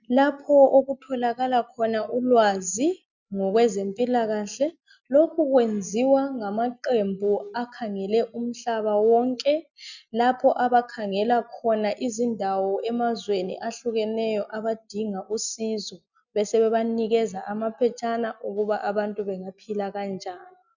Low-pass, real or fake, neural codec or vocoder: 7.2 kHz; real; none